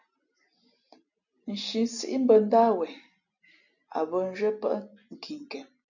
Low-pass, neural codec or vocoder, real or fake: 7.2 kHz; none; real